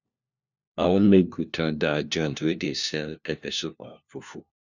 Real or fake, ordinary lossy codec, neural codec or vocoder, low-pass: fake; none; codec, 16 kHz, 1 kbps, FunCodec, trained on LibriTTS, 50 frames a second; 7.2 kHz